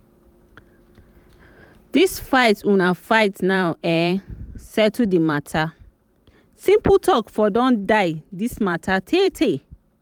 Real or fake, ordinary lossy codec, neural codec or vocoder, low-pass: fake; none; vocoder, 44.1 kHz, 128 mel bands every 512 samples, BigVGAN v2; 19.8 kHz